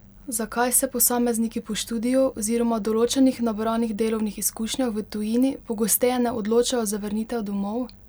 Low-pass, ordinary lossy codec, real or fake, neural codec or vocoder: none; none; real; none